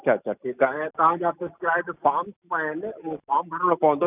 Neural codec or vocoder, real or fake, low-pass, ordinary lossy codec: none; real; 3.6 kHz; none